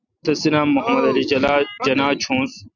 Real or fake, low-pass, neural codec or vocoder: real; 7.2 kHz; none